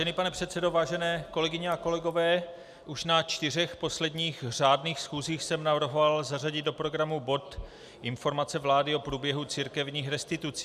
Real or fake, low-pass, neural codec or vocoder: real; 14.4 kHz; none